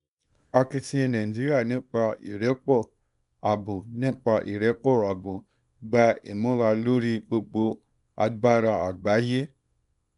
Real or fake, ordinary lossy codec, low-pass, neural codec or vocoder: fake; MP3, 96 kbps; 10.8 kHz; codec, 24 kHz, 0.9 kbps, WavTokenizer, small release